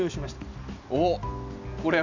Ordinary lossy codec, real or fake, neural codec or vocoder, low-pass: none; real; none; 7.2 kHz